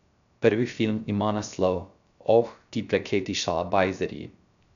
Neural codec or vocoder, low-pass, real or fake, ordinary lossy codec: codec, 16 kHz, 0.3 kbps, FocalCodec; 7.2 kHz; fake; none